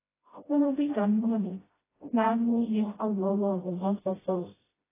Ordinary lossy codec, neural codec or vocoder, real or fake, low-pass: AAC, 16 kbps; codec, 16 kHz, 0.5 kbps, FreqCodec, smaller model; fake; 3.6 kHz